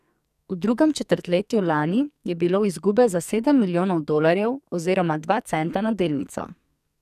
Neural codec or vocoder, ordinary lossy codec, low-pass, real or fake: codec, 44.1 kHz, 2.6 kbps, SNAC; none; 14.4 kHz; fake